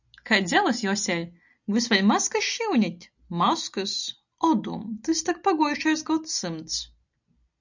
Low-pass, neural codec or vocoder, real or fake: 7.2 kHz; none; real